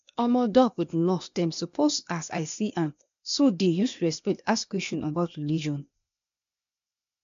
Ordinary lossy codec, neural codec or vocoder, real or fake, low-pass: MP3, 64 kbps; codec, 16 kHz, 0.8 kbps, ZipCodec; fake; 7.2 kHz